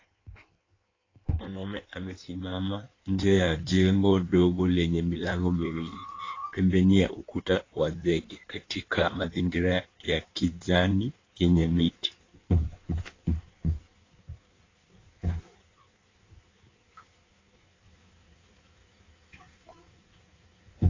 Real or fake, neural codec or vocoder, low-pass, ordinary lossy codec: fake; codec, 16 kHz in and 24 kHz out, 1.1 kbps, FireRedTTS-2 codec; 7.2 kHz; AAC, 32 kbps